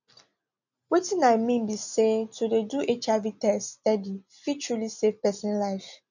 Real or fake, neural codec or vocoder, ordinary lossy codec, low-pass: real; none; none; 7.2 kHz